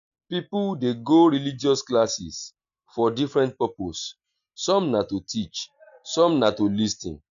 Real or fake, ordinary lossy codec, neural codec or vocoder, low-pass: real; none; none; 7.2 kHz